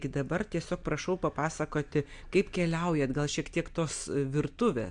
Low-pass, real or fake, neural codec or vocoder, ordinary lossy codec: 9.9 kHz; real; none; MP3, 64 kbps